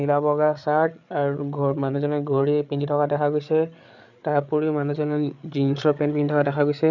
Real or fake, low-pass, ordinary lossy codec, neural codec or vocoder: fake; 7.2 kHz; none; codec, 16 kHz, 8 kbps, FreqCodec, larger model